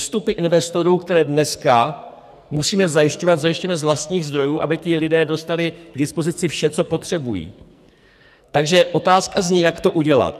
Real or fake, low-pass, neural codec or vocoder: fake; 14.4 kHz; codec, 44.1 kHz, 2.6 kbps, SNAC